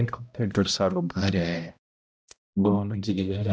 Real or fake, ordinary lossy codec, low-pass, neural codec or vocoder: fake; none; none; codec, 16 kHz, 0.5 kbps, X-Codec, HuBERT features, trained on balanced general audio